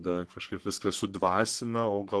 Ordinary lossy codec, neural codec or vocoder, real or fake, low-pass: Opus, 16 kbps; codec, 44.1 kHz, 3.4 kbps, Pupu-Codec; fake; 10.8 kHz